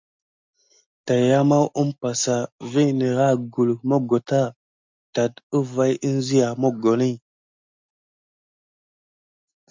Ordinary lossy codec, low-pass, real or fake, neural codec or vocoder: MP3, 64 kbps; 7.2 kHz; real; none